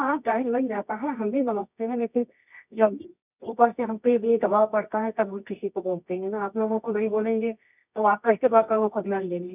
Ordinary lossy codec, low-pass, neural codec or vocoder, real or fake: none; 3.6 kHz; codec, 24 kHz, 0.9 kbps, WavTokenizer, medium music audio release; fake